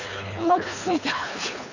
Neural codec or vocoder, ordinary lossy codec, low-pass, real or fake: codec, 24 kHz, 3 kbps, HILCodec; none; 7.2 kHz; fake